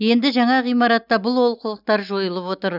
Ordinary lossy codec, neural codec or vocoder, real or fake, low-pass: none; none; real; 5.4 kHz